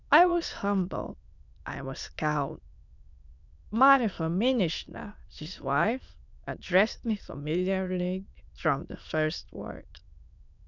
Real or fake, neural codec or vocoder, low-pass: fake; autoencoder, 22.05 kHz, a latent of 192 numbers a frame, VITS, trained on many speakers; 7.2 kHz